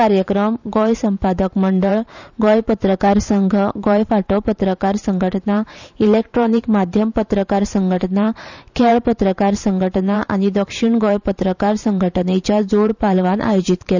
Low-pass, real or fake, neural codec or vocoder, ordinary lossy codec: 7.2 kHz; fake; vocoder, 44.1 kHz, 128 mel bands every 512 samples, BigVGAN v2; none